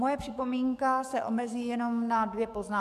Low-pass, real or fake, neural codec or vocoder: 14.4 kHz; fake; codec, 44.1 kHz, 7.8 kbps, DAC